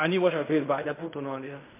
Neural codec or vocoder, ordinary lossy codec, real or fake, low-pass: codec, 16 kHz in and 24 kHz out, 0.4 kbps, LongCat-Audio-Codec, fine tuned four codebook decoder; MP3, 24 kbps; fake; 3.6 kHz